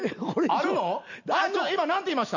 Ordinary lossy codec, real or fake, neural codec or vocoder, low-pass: none; real; none; 7.2 kHz